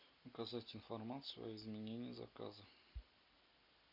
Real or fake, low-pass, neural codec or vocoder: real; 5.4 kHz; none